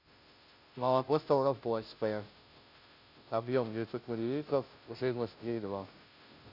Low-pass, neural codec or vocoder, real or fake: 5.4 kHz; codec, 16 kHz, 0.5 kbps, FunCodec, trained on Chinese and English, 25 frames a second; fake